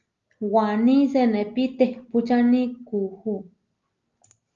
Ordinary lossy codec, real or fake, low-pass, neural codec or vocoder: Opus, 32 kbps; real; 7.2 kHz; none